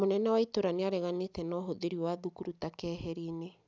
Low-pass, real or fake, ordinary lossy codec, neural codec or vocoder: none; real; none; none